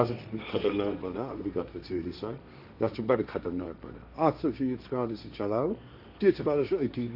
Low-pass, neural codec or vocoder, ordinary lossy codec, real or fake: 5.4 kHz; codec, 16 kHz, 1.1 kbps, Voila-Tokenizer; none; fake